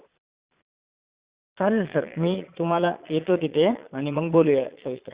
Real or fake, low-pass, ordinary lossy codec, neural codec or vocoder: fake; 3.6 kHz; Opus, 64 kbps; vocoder, 22.05 kHz, 80 mel bands, Vocos